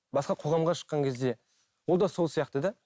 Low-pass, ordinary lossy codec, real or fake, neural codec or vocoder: none; none; real; none